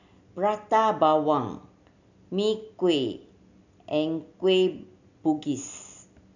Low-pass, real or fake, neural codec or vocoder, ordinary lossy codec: 7.2 kHz; real; none; none